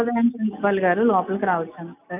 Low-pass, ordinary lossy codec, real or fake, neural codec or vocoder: 3.6 kHz; none; real; none